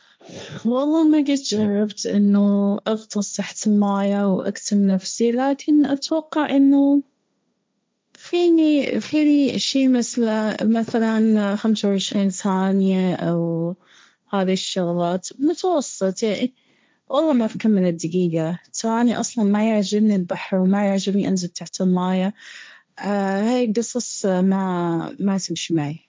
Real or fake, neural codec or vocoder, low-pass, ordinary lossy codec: fake; codec, 16 kHz, 1.1 kbps, Voila-Tokenizer; none; none